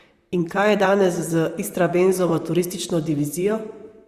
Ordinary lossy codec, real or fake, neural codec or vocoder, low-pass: Opus, 64 kbps; fake; vocoder, 44.1 kHz, 128 mel bands, Pupu-Vocoder; 14.4 kHz